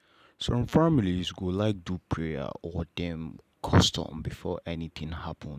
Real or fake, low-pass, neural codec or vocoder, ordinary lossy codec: real; 14.4 kHz; none; none